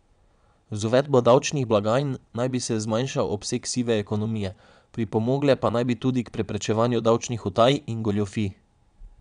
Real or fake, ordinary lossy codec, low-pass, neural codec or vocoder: fake; none; 9.9 kHz; vocoder, 22.05 kHz, 80 mel bands, Vocos